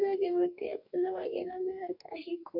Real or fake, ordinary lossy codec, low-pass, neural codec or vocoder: fake; none; 5.4 kHz; codec, 44.1 kHz, 2.6 kbps, DAC